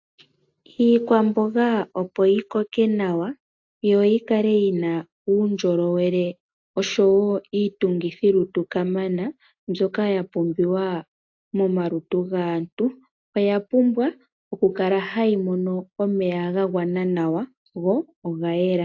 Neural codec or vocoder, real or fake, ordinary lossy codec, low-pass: none; real; AAC, 48 kbps; 7.2 kHz